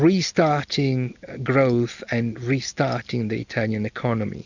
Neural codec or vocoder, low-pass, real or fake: none; 7.2 kHz; real